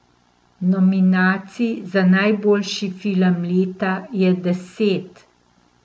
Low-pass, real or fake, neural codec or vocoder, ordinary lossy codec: none; real; none; none